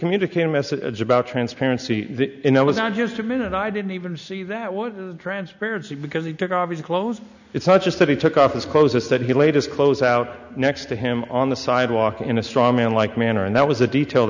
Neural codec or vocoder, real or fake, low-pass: none; real; 7.2 kHz